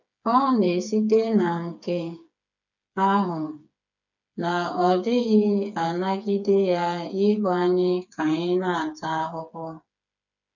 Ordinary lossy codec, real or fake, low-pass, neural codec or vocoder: none; fake; 7.2 kHz; codec, 16 kHz, 4 kbps, FreqCodec, smaller model